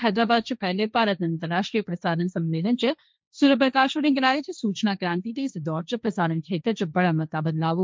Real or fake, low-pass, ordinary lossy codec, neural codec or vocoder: fake; none; none; codec, 16 kHz, 1.1 kbps, Voila-Tokenizer